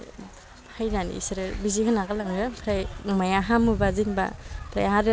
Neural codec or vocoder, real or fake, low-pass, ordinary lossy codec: none; real; none; none